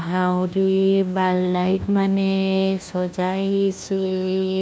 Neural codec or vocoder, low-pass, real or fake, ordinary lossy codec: codec, 16 kHz, 1 kbps, FunCodec, trained on LibriTTS, 50 frames a second; none; fake; none